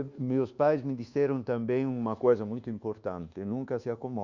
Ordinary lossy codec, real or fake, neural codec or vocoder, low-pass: Opus, 64 kbps; fake; codec, 24 kHz, 1.2 kbps, DualCodec; 7.2 kHz